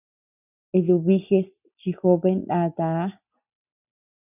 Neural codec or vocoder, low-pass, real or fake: none; 3.6 kHz; real